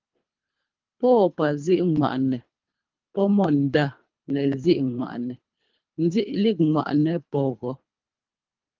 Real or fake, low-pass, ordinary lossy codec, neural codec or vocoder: fake; 7.2 kHz; Opus, 32 kbps; codec, 24 kHz, 3 kbps, HILCodec